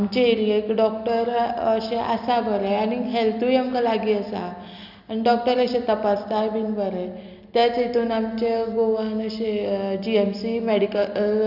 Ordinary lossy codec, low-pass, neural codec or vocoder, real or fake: none; 5.4 kHz; none; real